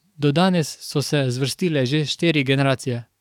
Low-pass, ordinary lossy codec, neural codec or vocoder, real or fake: 19.8 kHz; none; codec, 44.1 kHz, 7.8 kbps, DAC; fake